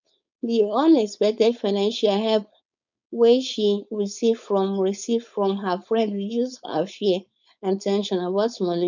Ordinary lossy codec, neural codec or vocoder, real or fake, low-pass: none; codec, 16 kHz, 4.8 kbps, FACodec; fake; 7.2 kHz